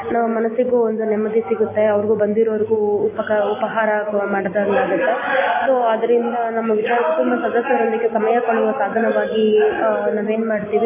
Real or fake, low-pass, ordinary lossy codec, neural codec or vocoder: real; 3.6 kHz; MP3, 16 kbps; none